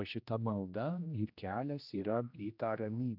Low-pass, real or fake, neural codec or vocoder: 5.4 kHz; fake; codec, 16 kHz, 1 kbps, X-Codec, HuBERT features, trained on general audio